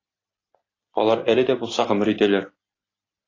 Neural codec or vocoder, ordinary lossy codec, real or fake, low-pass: none; AAC, 32 kbps; real; 7.2 kHz